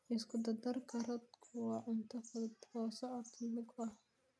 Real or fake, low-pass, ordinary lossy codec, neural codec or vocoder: real; none; none; none